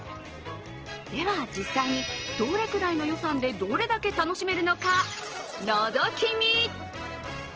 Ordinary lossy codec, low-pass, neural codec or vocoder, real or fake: Opus, 16 kbps; 7.2 kHz; none; real